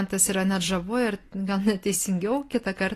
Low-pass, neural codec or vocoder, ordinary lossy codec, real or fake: 14.4 kHz; none; AAC, 48 kbps; real